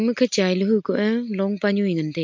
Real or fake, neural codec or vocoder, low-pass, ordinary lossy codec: real; none; 7.2 kHz; MP3, 48 kbps